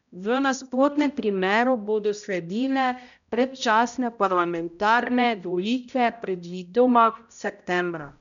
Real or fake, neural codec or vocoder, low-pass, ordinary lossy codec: fake; codec, 16 kHz, 0.5 kbps, X-Codec, HuBERT features, trained on balanced general audio; 7.2 kHz; none